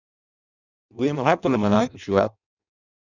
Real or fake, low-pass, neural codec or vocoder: fake; 7.2 kHz; codec, 16 kHz in and 24 kHz out, 0.6 kbps, FireRedTTS-2 codec